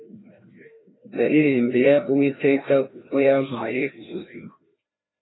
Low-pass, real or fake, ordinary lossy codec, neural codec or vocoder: 7.2 kHz; fake; AAC, 16 kbps; codec, 16 kHz, 1 kbps, FreqCodec, larger model